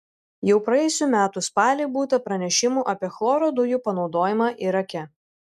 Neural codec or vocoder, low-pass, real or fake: none; 14.4 kHz; real